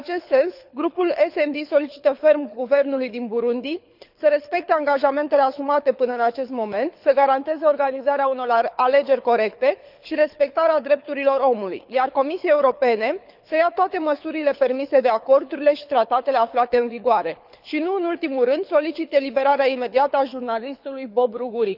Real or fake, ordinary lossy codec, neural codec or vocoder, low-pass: fake; none; codec, 24 kHz, 6 kbps, HILCodec; 5.4 kHz